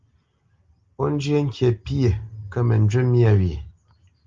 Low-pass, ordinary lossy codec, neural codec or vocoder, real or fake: 7.2 kHz; Opus, 32 kbps; none; real